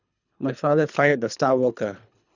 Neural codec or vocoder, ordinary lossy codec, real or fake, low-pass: codec, 24 kHz, 3 kbps, HILCodec; none; fake; 7.2 kHz